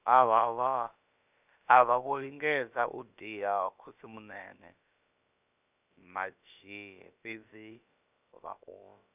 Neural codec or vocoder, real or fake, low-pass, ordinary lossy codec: codec, 16 kHz, about 1 kbps, DyCAST, with the encoder's durations; fake; 3.6 kHz; none